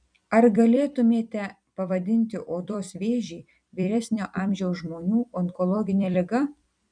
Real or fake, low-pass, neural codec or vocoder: fake; 9.9 kHz; vocoder, 44.1 kHz, 128 mel bands every 256 samples, BigVGAN v2